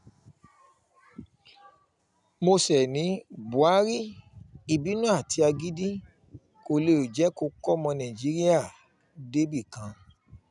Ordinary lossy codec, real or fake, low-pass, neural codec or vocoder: none; real; 10.8 kHz; none